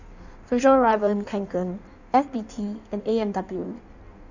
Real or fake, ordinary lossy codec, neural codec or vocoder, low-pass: fake; none; codec, 16 kHz in and 24 kHz out, 1.1 kbps, FireRedTTS-2 codec; 7.2 kHz